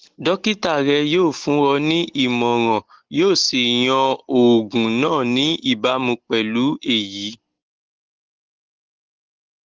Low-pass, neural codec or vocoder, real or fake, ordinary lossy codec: 7.2 kHz; none; real; Opus, 16 kbps